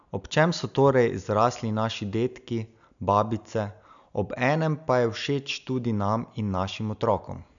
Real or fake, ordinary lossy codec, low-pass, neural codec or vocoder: real; none; 7.2 kHz; none